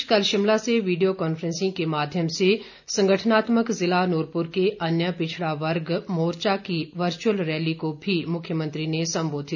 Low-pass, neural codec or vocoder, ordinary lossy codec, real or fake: 7.2 kHz; none; none; real